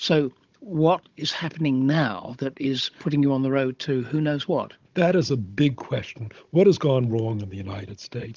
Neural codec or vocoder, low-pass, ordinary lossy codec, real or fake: codec, 16 kHz, 16 kbps, FunCodec, trained on Chinese and English, 50 frames a second; 7.2 kHz; Opus, 32 kbps; fake